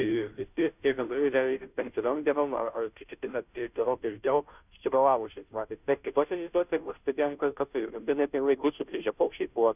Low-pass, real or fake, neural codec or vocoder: 3.6 kHz; fake; codec, 16 kHz, 0.5 kbps, FunCodec, trained on Chinese and English, 25 frames a second